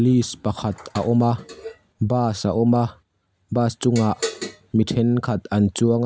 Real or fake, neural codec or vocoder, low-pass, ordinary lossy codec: real; none; none; none